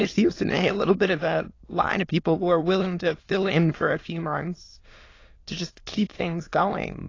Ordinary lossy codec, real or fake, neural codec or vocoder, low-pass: AAC, 32 kbps; fake; autoencoder, 22.05 kHz, a latent of 192 numbers a frame, VITS, trained on many speakers; 7.2 kHz